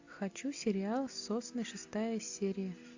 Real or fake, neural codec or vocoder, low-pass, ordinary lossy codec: real; none; 7.2 kHz; AAC, 48 kbps